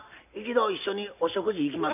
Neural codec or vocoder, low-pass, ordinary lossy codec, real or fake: none; 3.6 kHz; none; real